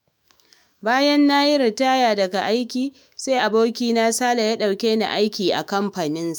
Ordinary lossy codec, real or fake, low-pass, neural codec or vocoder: none; fake; none; autoencoder, 48 kHz, 128 numbers a frame, DAC-VAE, trained on Japanese speech